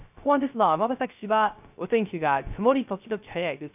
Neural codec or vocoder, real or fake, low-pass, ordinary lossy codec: codec, 16 kHz, 0.3 kbps, FocalCodec; fake; 3.6 kHz; none